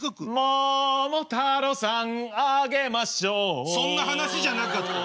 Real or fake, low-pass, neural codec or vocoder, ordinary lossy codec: real; none; none; none